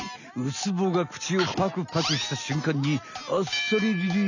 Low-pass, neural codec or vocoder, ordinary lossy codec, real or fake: 7.2 kHz; none; none; real